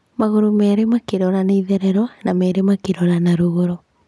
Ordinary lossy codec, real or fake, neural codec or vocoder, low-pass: none; real; none; none